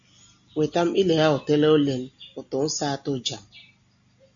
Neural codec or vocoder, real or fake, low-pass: none; real; 7.2 kHz